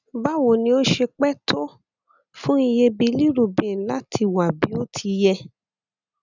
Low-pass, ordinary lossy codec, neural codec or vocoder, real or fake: 7.2 kHz; none; none; real